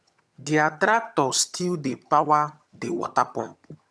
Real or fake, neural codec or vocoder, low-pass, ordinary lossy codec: fake; vocoder, 22.05 kHz, 80 mel bands, HiFi-GAN; none; none